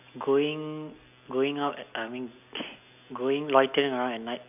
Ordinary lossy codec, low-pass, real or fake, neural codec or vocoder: none; 3.6 kHz; real; none